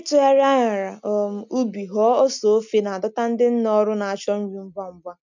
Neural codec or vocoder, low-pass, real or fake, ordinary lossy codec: none; 7.2 kHz; real; none